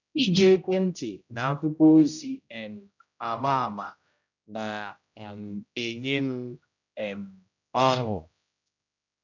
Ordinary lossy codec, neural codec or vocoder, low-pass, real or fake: none; codec, 16 kHz, 0.5 kbps, X-Codec, HuBERT features, trained on general audio; 7.2 kHz; fake